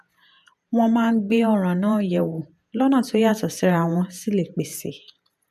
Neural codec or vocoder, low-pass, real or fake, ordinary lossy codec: vocoder, 48 kHz, 128 mel bands, Vocos; 14.4 kHz; fake; none